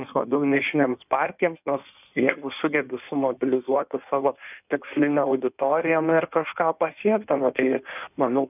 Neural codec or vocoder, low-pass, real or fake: codec, 16 kHz in and 24 kHz out, 1.1 kbps, FireRedTTS-2 codec; 3.6 kHz; fake